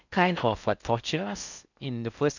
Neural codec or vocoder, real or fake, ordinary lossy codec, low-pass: codec, 16 kHz in and 24 kHz out, 0.6 kbps, FocalCodec, streaming, 4096 codes; fake; none; 7.2 kHz